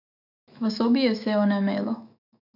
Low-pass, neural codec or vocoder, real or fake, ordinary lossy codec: 5.4 kHz; autoencoder, 48 kHz, 128 numbers a frame, DAC-VAE, trained on Japanese speech; fake; none